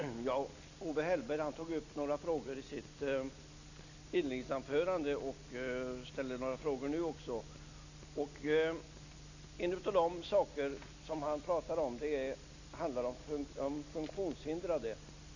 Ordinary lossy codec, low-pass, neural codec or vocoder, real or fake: none; 7.2 kHz; none; real